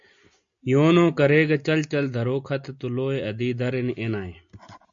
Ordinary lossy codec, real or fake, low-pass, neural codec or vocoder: AAC, 64 kbps; real; 7.2 kHz; none